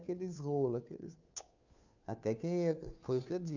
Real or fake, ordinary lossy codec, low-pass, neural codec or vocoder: fake; none; 7.2 kHz; codec, 16 kHz, 2 kbps, FunCodec, trained on Chinese and English, 25 frames a second